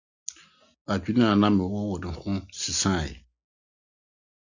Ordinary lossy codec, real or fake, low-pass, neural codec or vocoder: Opus, 64 kbps; real; 7.2 kHz; none